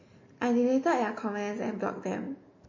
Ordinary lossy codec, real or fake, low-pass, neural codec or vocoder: MP3, 32 kbps; real; 7.2 kHz; none